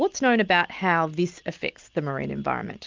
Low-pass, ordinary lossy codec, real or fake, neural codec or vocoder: 7.2 kHz; Opus, 32 kbps; real; none